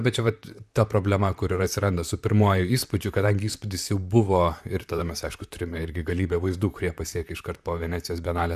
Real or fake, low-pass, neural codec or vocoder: fake; 14.4 kHz; vocoder, 44.1 kHz, 128 mel bands, Pupu-Vocoder